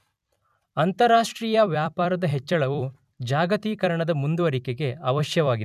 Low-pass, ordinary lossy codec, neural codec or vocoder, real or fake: 14.4 kHz; none; vocoder, 44.1 kHz, 128 mel bands every 256 samples, BigVGAN v2; fake